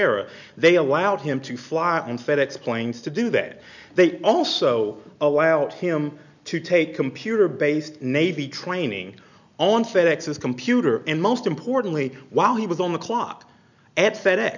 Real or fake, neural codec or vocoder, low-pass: real; none; 7.2 kHz